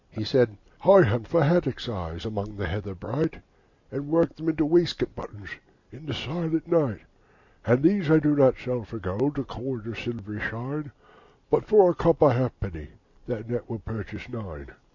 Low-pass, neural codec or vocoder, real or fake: 7.2 kHz; none; real